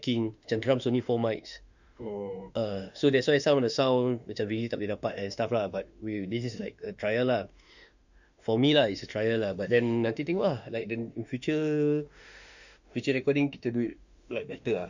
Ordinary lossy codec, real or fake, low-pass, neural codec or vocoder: none; fake; 7.2 kHz; autoencoder, 48 kHz, 32 numbers a frame, DAC-VAE, trained on Japanese speech